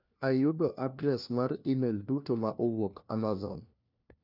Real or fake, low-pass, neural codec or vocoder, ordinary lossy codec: fake; 5.4 kHz; codec, 16 kHz, 1 kbps, FunCodec, trained on LibriTTS, 50 frames a second; none